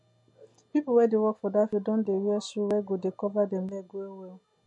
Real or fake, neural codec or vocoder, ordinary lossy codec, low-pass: real; none; MP3, 48 kbps; 10.8 kHz